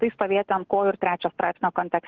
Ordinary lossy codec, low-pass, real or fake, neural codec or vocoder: Opus, 16 kbps; 7.2 kHz; real; none